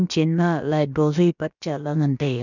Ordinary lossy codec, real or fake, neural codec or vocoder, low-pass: none; fake; codec, 16 kHz, about 1 kbps, DyCAST, with the encoder's durations; 7.2 kHz